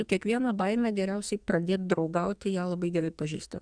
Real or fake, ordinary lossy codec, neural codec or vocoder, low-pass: fake; Opus, 64 kbps; codec, 44.1 kHz, 2.6 kbps, SNAC; 9.9 kHz